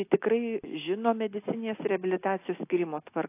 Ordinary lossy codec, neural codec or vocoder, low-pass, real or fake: AAC, 24 kbps; none; 3.6 kHz; real